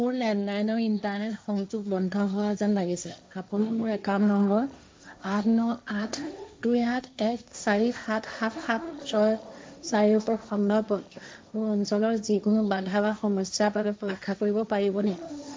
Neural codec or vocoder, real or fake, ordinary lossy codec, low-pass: codec, 16 kHz, 1.1 kbps, Voila-Tokenizer; fake; none; none